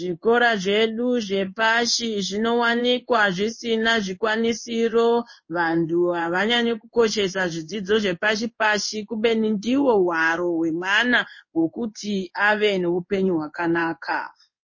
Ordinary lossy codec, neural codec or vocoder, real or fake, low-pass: MP3, 32 kbps; codec, 16 kHz in and 24 kHz out, 1 kbps, XY-Tokenizer; fake; 7.2 kHz